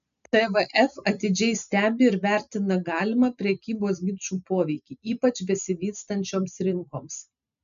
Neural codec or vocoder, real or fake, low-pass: none; real; 7.2 kHz